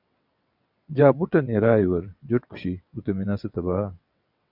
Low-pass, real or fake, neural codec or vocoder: 5.4 kHz; fake; vocoder, 22.05 kHz, 80 mel bands, WaveNeXt